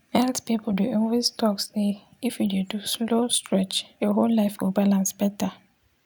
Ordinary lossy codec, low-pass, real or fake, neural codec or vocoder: none; none; real; none